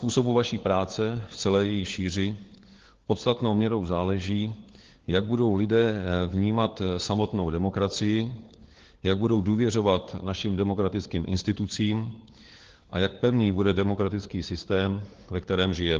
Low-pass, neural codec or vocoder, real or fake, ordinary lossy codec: 7.2 kHz; codec, 16 kHz, 4 kbps, FunCodec, trained on LibriTTS, 50 frames a second; fake; Opus, 16 kbps